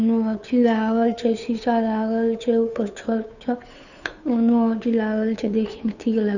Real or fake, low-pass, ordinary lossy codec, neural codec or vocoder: fake; 7.2 kHz; none; codec, 16 kHz, 2 kbps, FunCodec, trained on Chinese and English, 25 frames a second